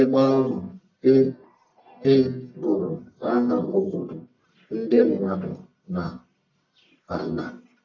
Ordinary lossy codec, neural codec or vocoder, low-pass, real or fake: none; codec, 44.1 kHz, 1.7 kbps, Pupu-Codec; 7.2 kHz; fake